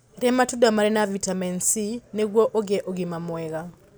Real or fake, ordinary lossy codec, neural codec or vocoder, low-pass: real; none; none; none